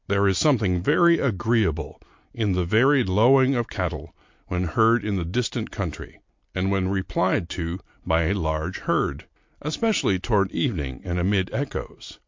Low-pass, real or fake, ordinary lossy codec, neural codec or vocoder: 7.2 kHz; real; MP3, 48 kbps; none